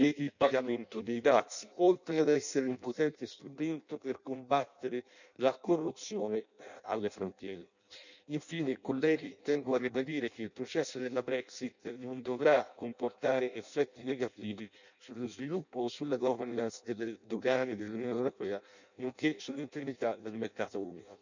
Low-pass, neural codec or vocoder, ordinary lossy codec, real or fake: 7.2 kHz; codec, 16 kHz in and 24 kHz out, 0.6 kbps, FireRedTTS-2 codec; none; fake